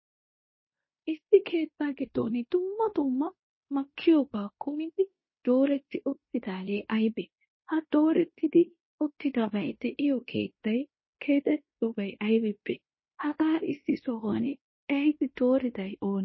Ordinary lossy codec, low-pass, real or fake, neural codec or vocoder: MP3, 24 kbps; 7.2 kHz; fake; codec, 16 kHz in and 24 kHz out, 0.9 kbps, LongCat-Audio-Codec, fine tuned four codebook decoder